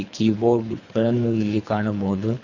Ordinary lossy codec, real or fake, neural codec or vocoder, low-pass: none; fake; codec, 24 kHz, 3 kbps, HILCodec; 7.2 kHz